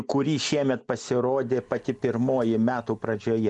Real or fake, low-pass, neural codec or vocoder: real; 10.8 kHz; none